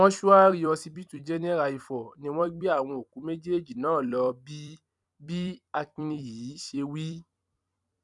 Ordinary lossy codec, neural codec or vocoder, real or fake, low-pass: none; vocoder, 44.1 kHz, 128 mel bands every 256 samples, BigVGAN v2; fake; 10.8 kHz